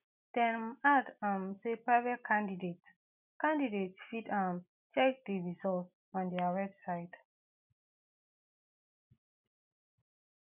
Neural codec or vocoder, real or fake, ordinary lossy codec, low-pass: none; real; none; 3.6 kHz